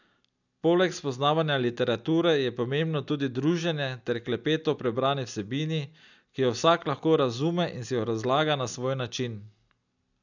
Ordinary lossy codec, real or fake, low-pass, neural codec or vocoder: none; real; 7.2 kHz; none